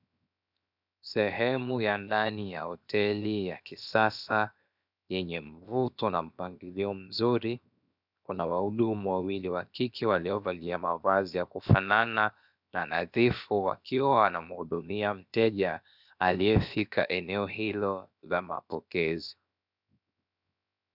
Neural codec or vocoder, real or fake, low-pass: codec, 16 kHz, 0.7 kbps, FocalCodec; fake; 5.4 kHz